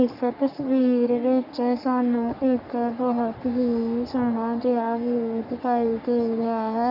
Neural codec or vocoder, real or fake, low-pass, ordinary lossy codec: codec, 44.1 kHz, 3.4 kbps, Pupu-Codec; fake; 5.4 kHz; none